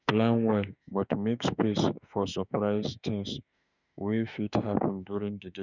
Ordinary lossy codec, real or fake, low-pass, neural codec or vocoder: none; fake; 7.2 kHz; autoencoder, 48 kHz, 32 numbers a frame, DAC-VAE, trained on Japanese speech